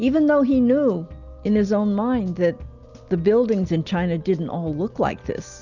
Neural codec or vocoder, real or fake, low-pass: none; real; 7.2 kHz